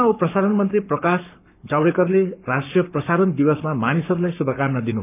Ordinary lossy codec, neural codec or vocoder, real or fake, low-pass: none; codec, 44.1 kHz, 7.8 kbps, Pupu-Codec; fake; 3.6 kHz